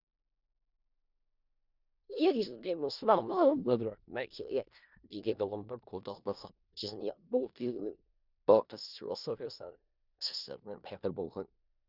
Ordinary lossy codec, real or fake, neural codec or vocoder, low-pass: none; fake; codec, 16 kHz in and 24 kHz out, 0.4 kbps, LongCat-Audio-Codec, four codebook decoder; 5.4 kHz